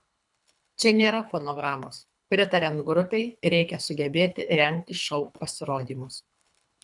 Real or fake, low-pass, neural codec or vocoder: fake; 10.8 kHz; codec, 24 kHz, 3 kbps, HILCodec